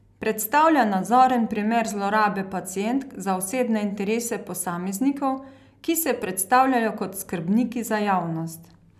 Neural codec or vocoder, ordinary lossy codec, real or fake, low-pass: none; none; real; 14.4 kHz